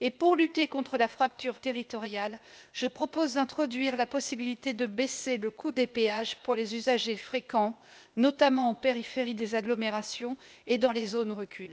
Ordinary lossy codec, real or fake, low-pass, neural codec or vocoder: none; fake; none; codec, 16 kHz, 0.8 kbps, ZipCodec